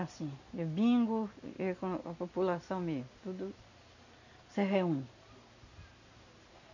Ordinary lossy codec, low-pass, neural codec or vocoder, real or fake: none; 7.2 kHz; none; real